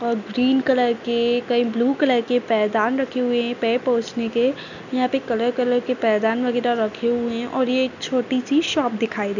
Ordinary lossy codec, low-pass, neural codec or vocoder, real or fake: none; 7.2 kHz; none; real